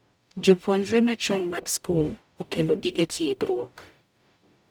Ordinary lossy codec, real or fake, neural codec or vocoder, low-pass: none; fake; codec, 44.1 kHz, 0.9 kbps, DAC; none